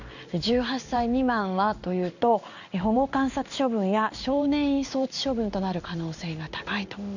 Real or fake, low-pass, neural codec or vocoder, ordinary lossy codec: fake; 7.2 kHz; codec, 16 kHz in and 24 kHz out, 1 kbps, XY-Tokenizer; none